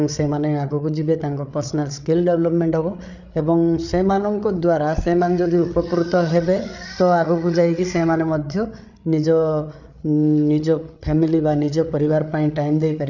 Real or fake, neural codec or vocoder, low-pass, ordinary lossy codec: fake; codec, 16 kHz, 8 kbps, FreqCodec, larger model; 7.2 kHz; none